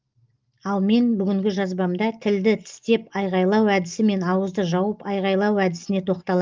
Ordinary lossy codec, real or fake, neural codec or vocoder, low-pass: Opus, 32 kbps; real; none; 7.2 kHz